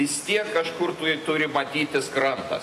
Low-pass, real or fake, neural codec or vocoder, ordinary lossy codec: 14.4 kHz; real; none; AAC, 48 kbps